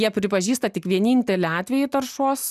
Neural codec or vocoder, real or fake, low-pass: none; real; 14.4 kHz